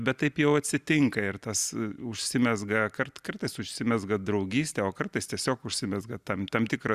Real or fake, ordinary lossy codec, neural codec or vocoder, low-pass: real; Opus, 64 kbps; none; 14.4 kHz